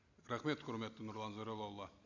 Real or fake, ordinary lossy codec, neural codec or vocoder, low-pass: real; none; none; 7.2 kHz